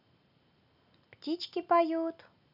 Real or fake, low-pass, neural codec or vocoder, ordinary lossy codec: real; 5.4 kHz; none; none